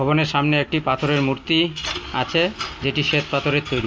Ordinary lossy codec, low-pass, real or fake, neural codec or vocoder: none; none; real; none